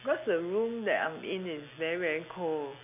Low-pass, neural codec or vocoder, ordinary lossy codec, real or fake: 3.6 kHz; none; none; real